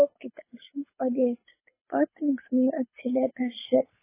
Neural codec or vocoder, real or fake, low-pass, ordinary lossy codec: codec, 24 kHz, 6 kbps, HILCodec; fake; 3.6 kHz; MP3, 16 kbps